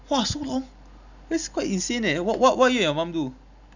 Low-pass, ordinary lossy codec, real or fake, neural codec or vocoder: 7.2 kHz; none; real; none